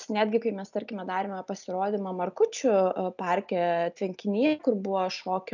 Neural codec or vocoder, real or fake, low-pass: none; real; 7.2 kHz